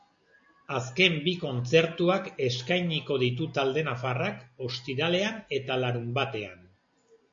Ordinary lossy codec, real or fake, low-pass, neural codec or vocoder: MP3, 48 kbps; real; 7.2 kHz; none